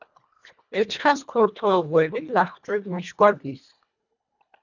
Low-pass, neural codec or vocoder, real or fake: 7.2 kHz; codec, 24 kHz, 1.5 kbps, HILCodec; fake